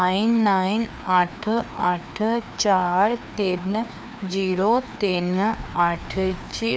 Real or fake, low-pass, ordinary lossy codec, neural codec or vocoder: fake; none; none; codec, 16 kHz, 2 kbps, FreqCodec, larger model